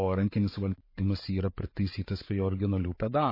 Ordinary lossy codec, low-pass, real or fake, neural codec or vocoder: MP3, 24 kbps; 5.4 kHz; fake; vocoder, 44.1 kHz, 128 mel bands every 512 samples, BigVGAN v2